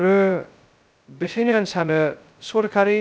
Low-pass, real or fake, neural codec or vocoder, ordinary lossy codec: none; fake; codec, 16 kHz, 0.2 kbps, FocalCodec; none